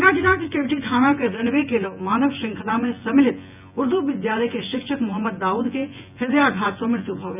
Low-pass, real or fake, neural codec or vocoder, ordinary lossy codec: 3.6 kHz; fake; vocoder, 24 kHz, 100 mel bands, Vocos; none